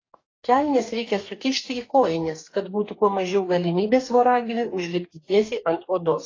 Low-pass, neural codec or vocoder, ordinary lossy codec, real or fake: 7.2 kHz; codec, 44.1 kHz, 2.6 kbps, DAC; AAC, 32 kbps; fake